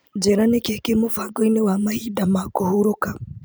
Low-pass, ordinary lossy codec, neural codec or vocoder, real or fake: none; none; none; real